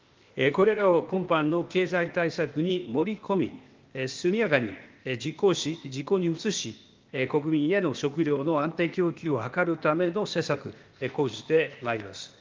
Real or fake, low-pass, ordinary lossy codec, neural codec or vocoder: fake; 7.2 kHz; Opus, 32 kbps; codec, 16 kHz, 0.8 kbps, ZipCodec